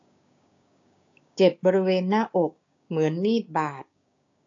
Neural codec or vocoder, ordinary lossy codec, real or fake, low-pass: codec, 16 kHz, 6 kbps, DAC; AAC, 48 kbps; fake; 7.2 kHz